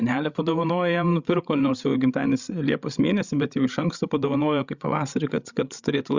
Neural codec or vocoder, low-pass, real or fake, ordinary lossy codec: codec, 16 kHz, 16 kbps, FreqCodec, larger model; 7.2 kHz; fake; Opus, 64 kbps